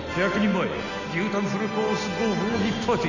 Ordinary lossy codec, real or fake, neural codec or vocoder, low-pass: none; real; none; 7.2 kHz